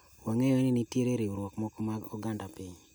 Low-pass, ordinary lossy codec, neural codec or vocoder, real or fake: none; none; none; real